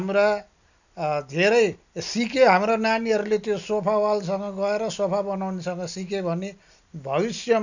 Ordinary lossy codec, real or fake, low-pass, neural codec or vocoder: none; real; 7.2 kHz; none